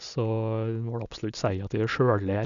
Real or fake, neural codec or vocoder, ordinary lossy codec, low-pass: real; none; none; 7.2 kHz